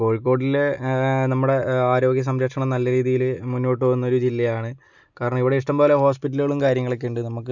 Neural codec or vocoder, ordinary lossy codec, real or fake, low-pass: none; none; real; 7.2 kHz